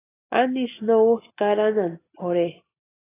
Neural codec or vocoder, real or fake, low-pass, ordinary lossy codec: none; real; 3.6 kHz; AAC, 16 kbps